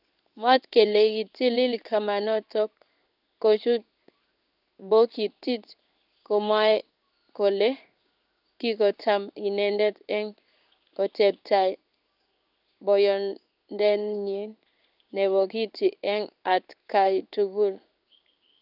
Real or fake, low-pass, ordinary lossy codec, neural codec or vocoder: fake; 5.4 kHz; none; codec, 16 kHz in and 24 kHz out, 1 kbps, XY-Tokenizer